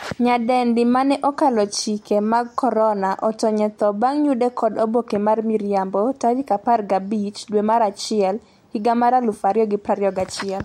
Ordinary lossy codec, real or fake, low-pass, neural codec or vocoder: MP3, 64 kbps; real; 19.8 kHz; none